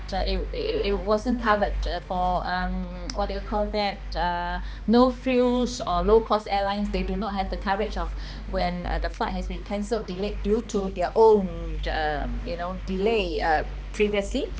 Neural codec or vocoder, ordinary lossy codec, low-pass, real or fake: codec, 16 kHz, 2 kbps, X-Codec, HuBERT features, trained on balanced general audio; none; none; fake